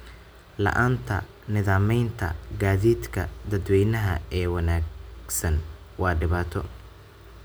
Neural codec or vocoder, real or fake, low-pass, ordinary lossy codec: none; real; none; none